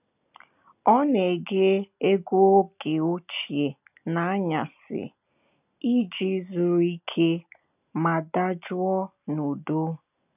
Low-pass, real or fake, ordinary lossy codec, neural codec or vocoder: 3.6 kHz; real; none; none